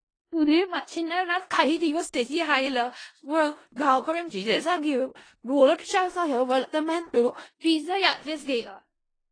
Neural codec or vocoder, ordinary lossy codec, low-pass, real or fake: codec, 16 kHz in and 24 kHz out, 0.4 kbps, LongCat-Audio-Codec, four codebook decoder; AAC, 32 kbps; 9.9 kHz; fake